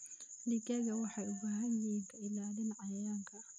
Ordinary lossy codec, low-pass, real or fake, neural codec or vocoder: none; 9.9 kHz; real; none